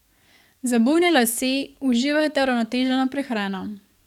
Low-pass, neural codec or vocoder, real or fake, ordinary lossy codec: 19.8 kHz; codec, 44.1 kHz, 7.8 kbps, DAC; fake; none